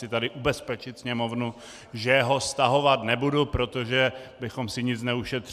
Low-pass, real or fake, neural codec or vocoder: 14.4 kHz; real; none